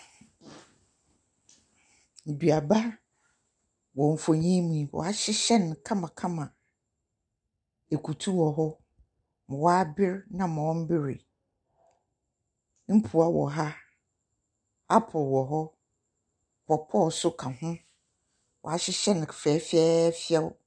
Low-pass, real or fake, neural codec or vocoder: 9.9 kHz; real; none